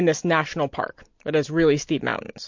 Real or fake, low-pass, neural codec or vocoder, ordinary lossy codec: real; 7.2 kHz; none; MP3, 48 kbps